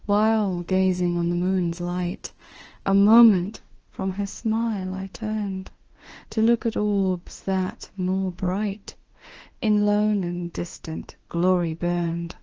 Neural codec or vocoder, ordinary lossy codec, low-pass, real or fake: autoencoder, 48 kHz, 32 numbers a frame, DAC-VAE, trained on Japanese speech; Opus, 16 kbps; 7.2 kHz; fake